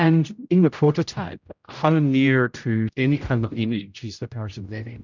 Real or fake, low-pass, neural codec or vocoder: fake; 7.2 kHz; codec, 16 kHz, 0.5 kbps, X-Codec, HuBERT features, trained on general audio